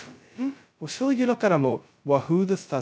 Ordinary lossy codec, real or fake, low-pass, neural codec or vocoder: none; fake; none; codec, 16 kHz, 0.2 kbps, FocalCodec